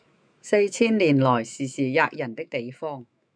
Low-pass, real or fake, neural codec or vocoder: 9.9 kHz; fake; autoencoder, 48 kHz, 128 numbers a frame, DAC-VAE, trained on Japanese speech